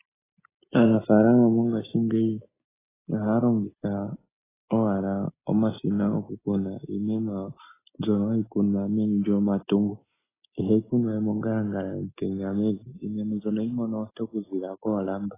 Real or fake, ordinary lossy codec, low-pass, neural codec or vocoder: real; AAC, 16 kbps; 3.6 kHz; none